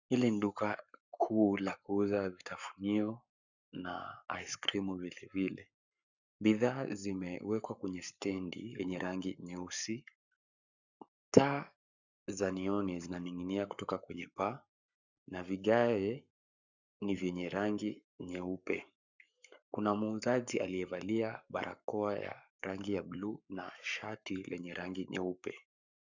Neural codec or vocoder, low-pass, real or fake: codec, 44.1 kHz, 7.8 kbps, Pupu-Codec; 7.2 kHz; fake